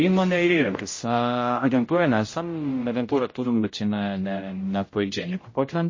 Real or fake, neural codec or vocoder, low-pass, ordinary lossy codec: fake; codec, 16 kHz, 0.5 kbps, X-Codec, HuBERT features, trained on general audio; 7.2 kHz; MP3, 32 kbps